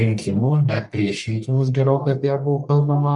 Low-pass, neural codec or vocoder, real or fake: 10.8 kHz; codec, 44.1 kHz, 1.7 kbps, Pupu-Codec; fake